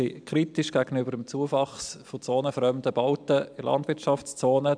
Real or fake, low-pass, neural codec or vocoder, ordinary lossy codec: real; 9.9 kHz; none; MP3, 96 kbps